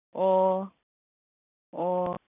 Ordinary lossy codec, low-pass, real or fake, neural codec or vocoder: none; 3.6 kHz; real; none